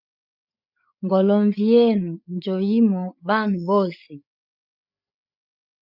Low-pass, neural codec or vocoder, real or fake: 5.4 kHz; codec, 16 kHz, 8 kbps, FreqCodec, larger model; fake